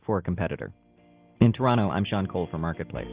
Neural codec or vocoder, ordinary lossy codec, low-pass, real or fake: none; Opus, 32 kbps; 3.6 kHz; real